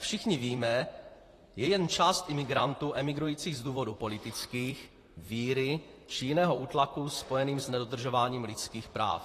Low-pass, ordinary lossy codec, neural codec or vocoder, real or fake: 14.4 kHz; AAC, 48 kbps; vocoder, 44.1 kHz, 128 mel bands, Pupu-Vocoder; fake